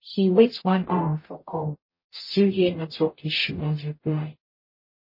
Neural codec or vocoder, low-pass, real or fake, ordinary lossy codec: codec, 44.1 kHz, 0.9 kbps, DAC; 5.4 kHz; fake; MP3, 24 kbps